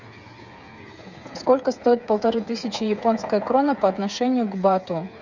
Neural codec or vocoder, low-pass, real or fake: codec, 16 kHz, 8 kbps, FreqCodec, smaller model; 7.2 kHz; fake